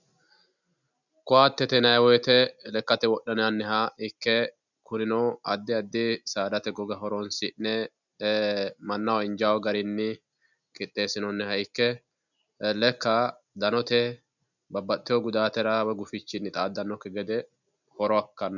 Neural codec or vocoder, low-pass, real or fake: none; 7.2 kHz; real